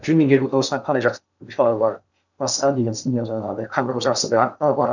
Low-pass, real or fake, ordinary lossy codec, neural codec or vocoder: 7.2 kHz; fake; none; codec, 16 kHz in and 24 kHz out, 0.6 kbps, FocalCodec, streaming, 2048 codes